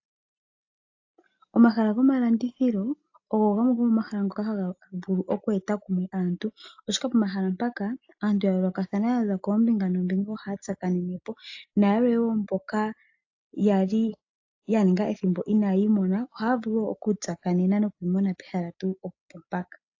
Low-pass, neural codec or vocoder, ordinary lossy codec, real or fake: 7.2 kHz; none; AAC, 48 kbps; real